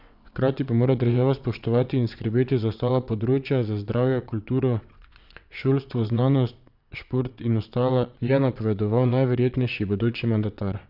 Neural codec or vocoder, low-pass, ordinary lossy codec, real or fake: vocoder, 22.05 kHz, 80 mel bands, WaveNeXt; 5.4 kHz; none; fake